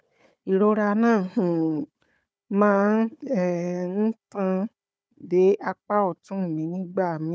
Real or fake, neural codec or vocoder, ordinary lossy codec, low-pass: fake; codec, 16 kHz, 4 kbps, FunCodec, trained on Chinese and English, 50 frames a second; none; none